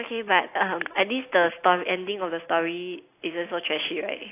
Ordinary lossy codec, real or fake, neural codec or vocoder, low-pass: AAC, 24 kbps; real; none; 3.6 kHz